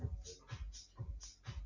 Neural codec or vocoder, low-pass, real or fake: none; 7.2 kHz; real